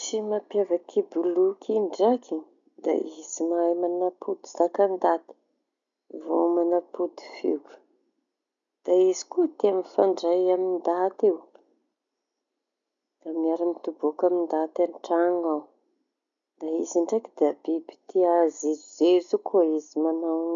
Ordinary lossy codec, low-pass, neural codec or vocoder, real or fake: none; 7.2 kHz; none; real